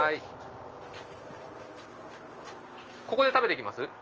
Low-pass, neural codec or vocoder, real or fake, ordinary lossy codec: 7.2 kHz; none; real; Opus, 24 kbps